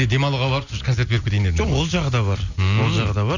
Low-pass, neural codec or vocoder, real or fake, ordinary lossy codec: 7.2 kHz; none; real; none